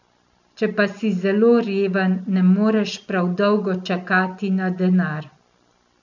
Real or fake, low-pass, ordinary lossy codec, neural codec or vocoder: real; 7.2 kHz; none; none